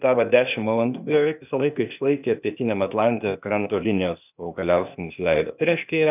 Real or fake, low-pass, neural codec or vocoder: fake; 3.6 kHz; codec, 16 kHz, 0.8 kbps, ZipCodec